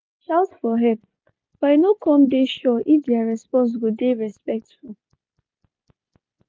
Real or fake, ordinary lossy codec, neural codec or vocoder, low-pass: real; none; none; none